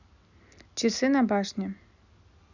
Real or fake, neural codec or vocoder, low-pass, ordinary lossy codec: real; none; 7.2 kHz; none